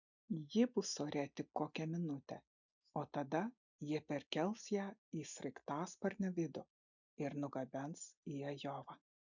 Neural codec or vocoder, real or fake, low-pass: none; real; 7.2 kHz